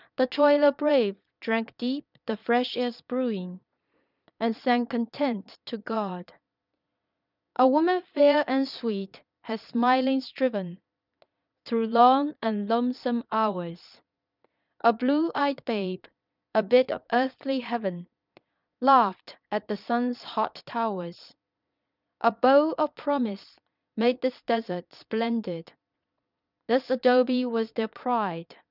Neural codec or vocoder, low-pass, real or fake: vocoder, 22.05 kHz, 80 mel bands, Vocos; 5.4 kHz; fake